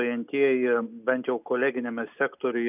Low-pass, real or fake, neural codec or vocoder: 3.6 kHz; real; none